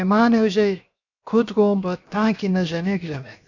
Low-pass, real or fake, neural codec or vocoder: 7.2 kHz; fake; codec, 16 kHz, 0.7 kbps, FocalCodec